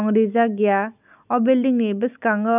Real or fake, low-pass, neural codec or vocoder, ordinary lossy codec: real; 3.6 kHz; none; none